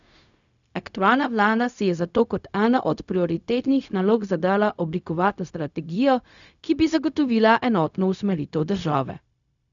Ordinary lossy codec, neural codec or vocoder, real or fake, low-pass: none; codec, 16 kHz, 0.4 kbps, LongCat-Audio-Codec; fake; 7.2 kHz